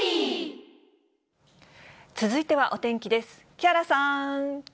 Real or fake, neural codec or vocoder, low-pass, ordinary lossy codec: real; none; none; none